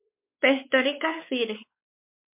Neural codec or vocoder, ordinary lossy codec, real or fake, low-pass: codec, 16 kHz, 4 kbps, X-Codec, WavLM features, trained on Multilingual LibriSpeech; MP3, 32 kbps; fake; 3.6 kHz